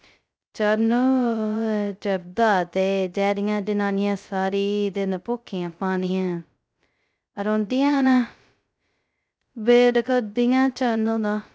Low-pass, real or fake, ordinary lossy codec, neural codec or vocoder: none; fake; none; codec, 16 kHz, 0.2 kbps, FocalCodec